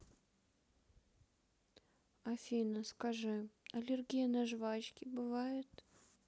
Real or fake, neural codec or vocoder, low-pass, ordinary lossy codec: real; none; none; none